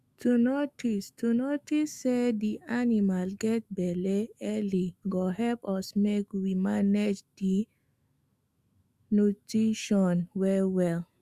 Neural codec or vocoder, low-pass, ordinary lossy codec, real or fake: autoencoder, 48 kHz, 128 numbers a frame, DAC-VAE, trained on Japanese speech; 14.4 kHz; Opus, 64 kbps; fake